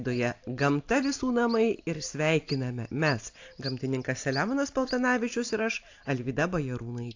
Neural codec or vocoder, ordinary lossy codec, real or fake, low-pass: none; AAC, 48 kbps; real; 7.2 kHz